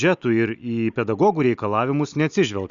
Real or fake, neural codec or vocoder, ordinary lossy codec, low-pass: real; none; Opus, 64 kbps; 7.2 kHz